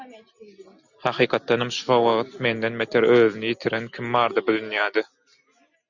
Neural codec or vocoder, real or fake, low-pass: none; real; 7.2 kHz